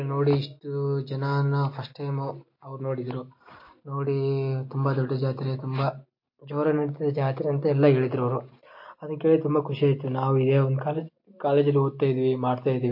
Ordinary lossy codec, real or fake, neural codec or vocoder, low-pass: MP3, 32 kbps; real; none; 5.4 kHz